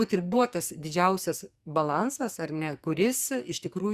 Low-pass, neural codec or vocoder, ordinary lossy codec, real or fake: 14.4 kHz; codec, 44.1 kHz, 2.6 kbps, SNAC; Opus, 64 kbps; fake